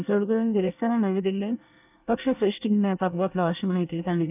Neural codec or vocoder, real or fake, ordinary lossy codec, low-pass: codec, 24 kHz, 1 kbps, SNAC; fake; none; 3.6 kHz